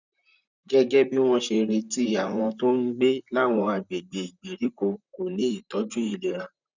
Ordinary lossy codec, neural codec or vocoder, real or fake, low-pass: none; vocoder, 44.1 kHz, 128 mel bands, Pupu-Vocoder; fake; 7.2 kHz